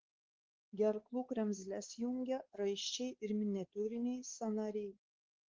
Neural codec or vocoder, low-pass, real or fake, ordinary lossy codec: codec, 16 kHz, 4 kbps, X-Codec, WavLM features, trained on Multilingual LibriSpeech; 7.2 kHz; fake; Opus, 16 kbps